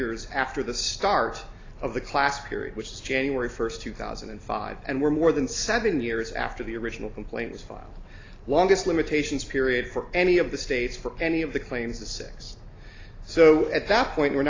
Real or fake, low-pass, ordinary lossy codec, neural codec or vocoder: real; 7.2 kHz; AAC, 32 kbps; none